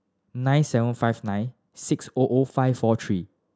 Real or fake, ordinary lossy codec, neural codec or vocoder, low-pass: real; none; none; none